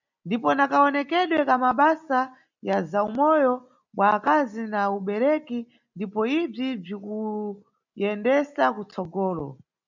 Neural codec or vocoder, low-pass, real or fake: none; 7.2 kHz; real